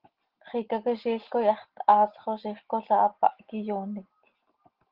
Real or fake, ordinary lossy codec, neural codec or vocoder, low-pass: real; Opus, 16 kbps; none; 5.4 kHz